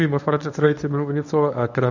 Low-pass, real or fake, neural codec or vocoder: 7.2 kHz; fake; codec, 24 kHz, 0.9 kbps, WavTokenizer, medium speech release version 1